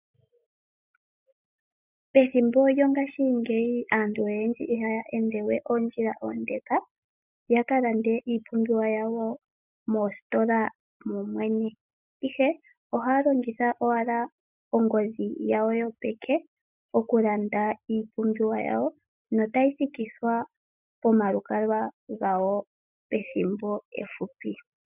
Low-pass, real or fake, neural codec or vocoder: 3.6 kHz; real; none